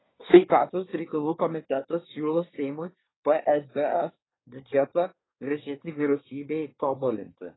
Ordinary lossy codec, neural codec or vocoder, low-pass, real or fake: AAC, 16 kbps; codec, 24 kHz, 1 kbps, SNAC; 7.2 kHz; fake